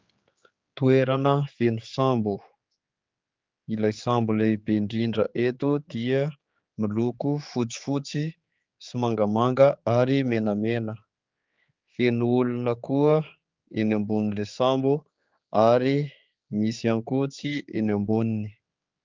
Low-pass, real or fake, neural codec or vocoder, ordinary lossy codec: 7.2 kHz; fake; codec, 16 kHz, 4 kbps, X-Codec, HuBERT features, trained on general audio; Opus, 24 kbps